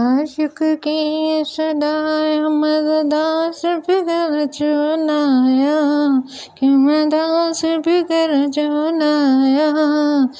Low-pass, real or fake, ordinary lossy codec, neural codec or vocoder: none; real; none; none